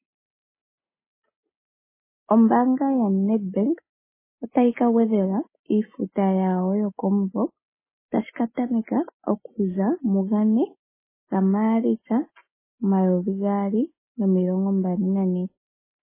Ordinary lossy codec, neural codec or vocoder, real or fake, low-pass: MP3, 16 kbps; none; real; 3.6 kHz